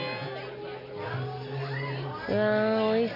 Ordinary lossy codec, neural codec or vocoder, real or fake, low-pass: none; none; real; 5.4 kHz